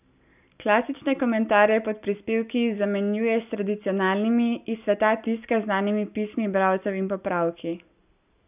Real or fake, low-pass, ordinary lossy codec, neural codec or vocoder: real; 3.6 kHz; none; none